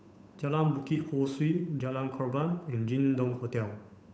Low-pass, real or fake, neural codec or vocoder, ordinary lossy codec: none; fake; codec, 16 kHz, 8 kbps, FunCodec, trained on Chinese and English, 25 frames a second; none